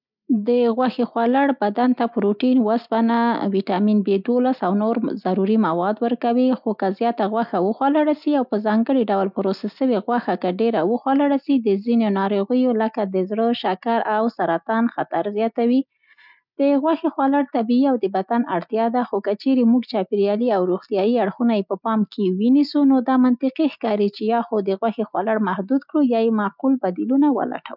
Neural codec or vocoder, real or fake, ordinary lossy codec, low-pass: none; real; none; 5.4 kHz